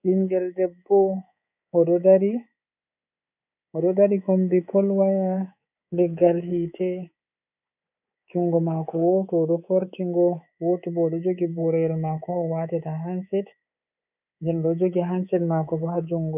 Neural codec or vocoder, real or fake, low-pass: codec, 24 kHz, 3.1 kbps, DualCodec; fake; 3.6 kHz